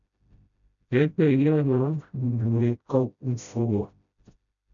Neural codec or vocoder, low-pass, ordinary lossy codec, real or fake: codec, 16 kHz, 0.5 kbps, FreqCodec, smaller model; 7.2 kHz; MP3, 96 kbps; fake